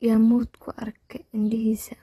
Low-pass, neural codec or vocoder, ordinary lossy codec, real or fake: 19.8 kHz; vocoder, 44.1 kHz, 128 mel bands every 256 samples, BigVGAN v2; AAC, 32 kbps; fake